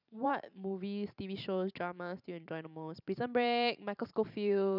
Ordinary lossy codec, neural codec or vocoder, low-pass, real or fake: none; vocoder, 44.1 kHz, 128 mel bands every 512 samples, BigVGAN v2; 5.4 kHz; fake